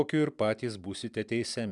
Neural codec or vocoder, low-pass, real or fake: none; 10.8 kHz; real